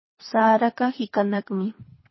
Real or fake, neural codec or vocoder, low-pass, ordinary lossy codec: fake; codec, 24 kHz, 3 kbps, HILCodec; 7.2 kHz; MP3, 24 kbps